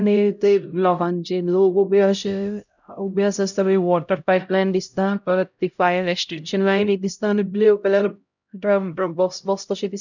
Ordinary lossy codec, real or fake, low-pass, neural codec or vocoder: none; fake; 7.2 kHz; codec, 16 kHz, 0.5 kbps, X-Codec, HuBERT features, trained on LibriSpeech